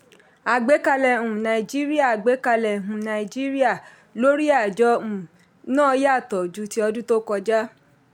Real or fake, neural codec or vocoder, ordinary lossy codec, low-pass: real; none; MP3, 96 kbps; 19.8 kHz